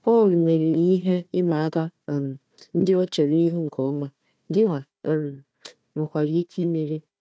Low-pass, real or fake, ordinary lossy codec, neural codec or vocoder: none; fake; none; codec, 16 kHz, 1 kbps, FunCodec, trained on Chinese and English, 50 frames a second